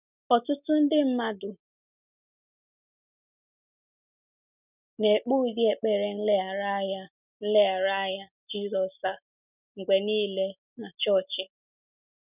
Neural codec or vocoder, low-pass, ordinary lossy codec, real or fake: none; 3.6 kHz; none; real